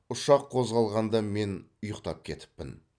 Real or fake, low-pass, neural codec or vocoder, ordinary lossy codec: real; 9.9 kHz; none; none